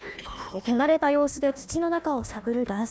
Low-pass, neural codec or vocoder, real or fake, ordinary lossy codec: none; codec, 16 kHz, 1 kbps, FunCodec, trained on Chinese and English, 50 frames a second; fake; none